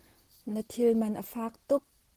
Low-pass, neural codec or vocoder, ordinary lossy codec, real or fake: 14.4 kHz; none; Opus, 16 kbps; real